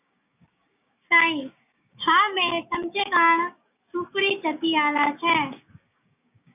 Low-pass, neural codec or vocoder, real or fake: 3.6 kHz; codec, 16 kHz, 6 kbps, DAC; fake